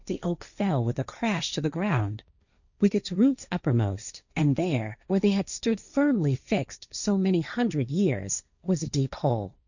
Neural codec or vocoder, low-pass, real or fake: codec, 16 kHz, 1.1 kbps, Voila-Tokenizer; 7.2 kHz; fake